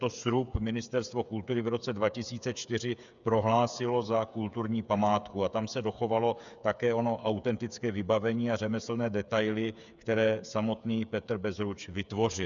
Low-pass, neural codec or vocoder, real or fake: 7.2 kHz; codec, 16 kHz, 8 kbps, FreqCodec, smaller model; fake